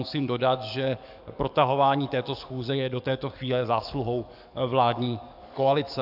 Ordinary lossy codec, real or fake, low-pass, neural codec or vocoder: AAC, 48 kbps; fake; 5.4 kHz; codec, 44.1 kHz, 7.8 kbps, DAC